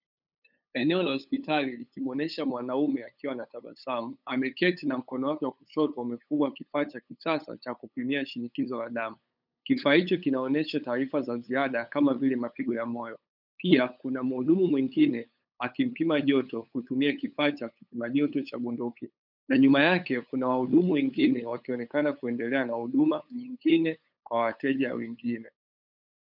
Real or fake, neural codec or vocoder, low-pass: fake; codec, 16 kHz, 8 kbps, FunCodec, trained on LibriTTS, 25 frames a second; 5.4 kHz